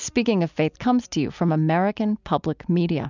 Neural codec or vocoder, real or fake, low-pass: none; real; 7.2 kHz